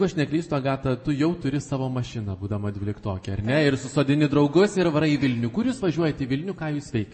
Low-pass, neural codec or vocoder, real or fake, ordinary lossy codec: 10.8 kHz; none; real; MP3, 32 kbps